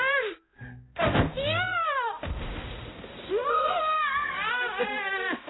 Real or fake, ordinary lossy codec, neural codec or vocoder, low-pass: fake; AAC, 16 kbps; codec, 16 kHz, 0.5 kbps, X-Codec, HuBERT features, trained on balanced general audio; 7.2 kHz